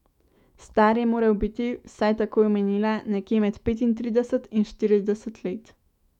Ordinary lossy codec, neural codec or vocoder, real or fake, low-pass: MP3, 96 kbps; autoencoder, 48 kHz, 128 numbers a frame, DAC-VAE, trained on Japanese speech; fake; 19.8 kHz